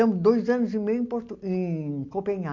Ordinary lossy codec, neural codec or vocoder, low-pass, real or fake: MP3, 48 kbps; none; 7.2 kHz; real